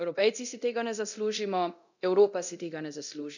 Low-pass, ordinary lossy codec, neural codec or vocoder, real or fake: 7.2 kHz; none; codec, 24 kHz, 0.9 kbps, DualCodec; fake